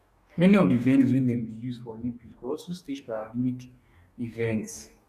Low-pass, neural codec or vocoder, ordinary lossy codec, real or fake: 14.4 kHz; codec, 44.1 kHz, 2.6 kbps, DAC; none; fake